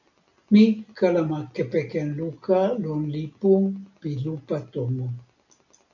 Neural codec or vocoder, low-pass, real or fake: none; 7.2 kHz; real